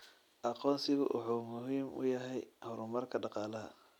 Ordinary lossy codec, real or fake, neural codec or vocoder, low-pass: none; real; none; 19.8 kHz